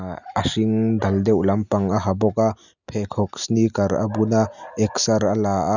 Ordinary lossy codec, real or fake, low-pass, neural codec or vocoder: none; real; 7.2 kHz; none